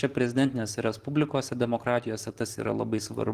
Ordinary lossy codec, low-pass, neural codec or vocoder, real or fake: Opus, 16 kbps; 14.4 kHz; none; real